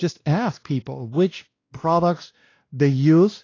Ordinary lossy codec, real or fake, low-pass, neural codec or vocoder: AAC, 32 kbps; fake; 7.2 kHz; codec, 16 kHz, 1 kbps, X-Codec, WavLM features, trained on Multilingual LibriSpeech